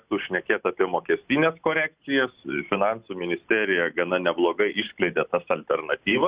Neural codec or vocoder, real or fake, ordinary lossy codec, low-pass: none; real; Opus, 64 kbps; 3.6 kHz